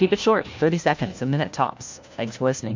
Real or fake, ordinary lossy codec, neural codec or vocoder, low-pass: fake; MP3, 64 kbps; codec, 16 kHz, 1 kbps, FunCodec, trained on LibriTTS, 50 frames a second; 7.2 kHz